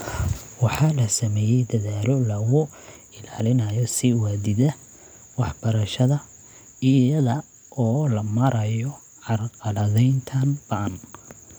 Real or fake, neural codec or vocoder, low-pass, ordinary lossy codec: real; none; none; none